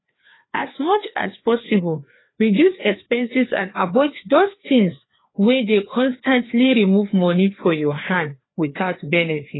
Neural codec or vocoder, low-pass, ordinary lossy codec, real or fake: codec, 16 kHz, 2 kbps, FreqCodec, larger model; 7.2 kHz; AAC, 16 kbps; fake